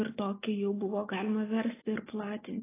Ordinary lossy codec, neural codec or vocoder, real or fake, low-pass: AAC, 16 kbps; none; real; 3.6 kHz